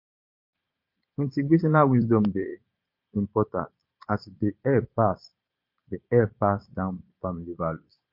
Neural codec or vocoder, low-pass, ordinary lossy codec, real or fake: vocoder, 22.05 kHz, 80 mel bands, Vocos; 5.4 kHz; MP3, 32 kbps; fake